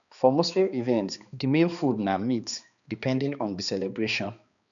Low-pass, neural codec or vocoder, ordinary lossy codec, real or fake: 7.2 kHz; codec, 16 kHz, 2 kbps, X-Codec, HuBERT features, trained on balanced general audio; none; fake